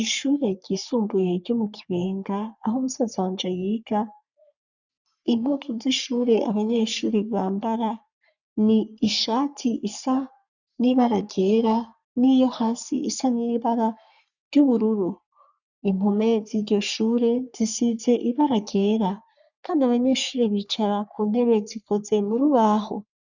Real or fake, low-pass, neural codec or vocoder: fake; 7.2 kHz; codec, 44.1 kHz, 3.4 kbps, Pupu-Codec